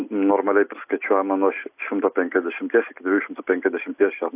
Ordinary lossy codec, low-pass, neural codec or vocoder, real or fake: AAC, 32 kbps; 3.6 kHz; none; real